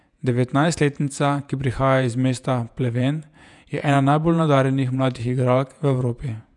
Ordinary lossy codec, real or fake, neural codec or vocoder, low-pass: none; fake; vocoder, 48 kHz, 128 mel bands, Vocos; 10.8 kHz